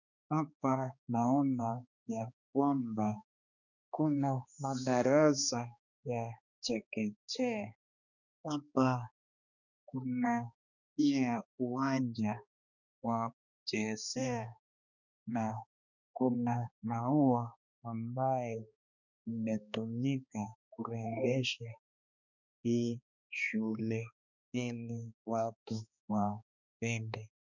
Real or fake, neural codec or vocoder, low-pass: fake; codec, 16 kHz, 2 kbps, X-Codec, HuBERT features, trained on balanced general audio; 7.2 kHz